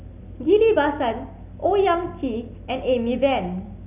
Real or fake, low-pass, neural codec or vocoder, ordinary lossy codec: real; 3.6 kHz; none; none